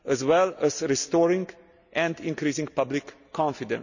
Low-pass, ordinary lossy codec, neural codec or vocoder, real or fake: 7.2 kHz; none; none; real